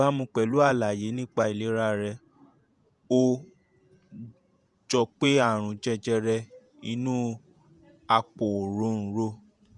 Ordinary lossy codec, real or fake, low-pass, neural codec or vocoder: none; fake; 10.8 kHz; vocoder, 48 kHz, 128 mel bands, Vocos